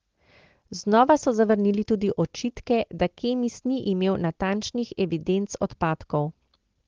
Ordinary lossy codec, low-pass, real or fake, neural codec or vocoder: Opus, 16 kbps; 7.2 kHz; real; none